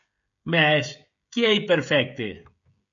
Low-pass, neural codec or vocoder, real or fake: 7.2 kHz; codec, 16 kHz, 16 kbps, FreqCodec, smaller model; fake